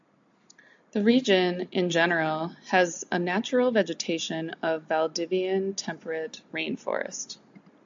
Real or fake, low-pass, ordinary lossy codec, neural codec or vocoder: real; 7.2 kHz; AAC, 64 kbps; none